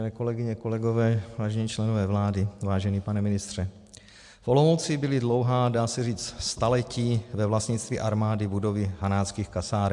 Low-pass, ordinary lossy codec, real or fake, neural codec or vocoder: 10.8 kHz; MP3, 64 kbps; real; none